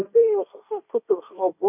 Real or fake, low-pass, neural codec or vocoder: fake; 3.6 kHz; codec, 16 kHz, 1.1 kbps, Voila-Tokenizer